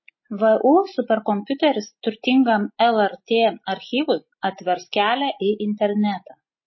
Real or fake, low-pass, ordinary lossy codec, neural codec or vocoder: real; 7.2 kHz; MP3, 24 kbps; none